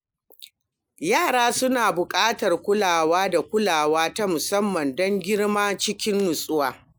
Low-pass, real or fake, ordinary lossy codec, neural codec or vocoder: none; real; none; none